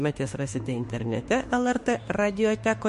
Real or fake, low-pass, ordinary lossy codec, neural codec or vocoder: fake; 14.4 kHz; MP3, 48 kbps; autoencoder, 48 kHz, 32 numbers a frame, DAC-VAE, trained on Japanese speech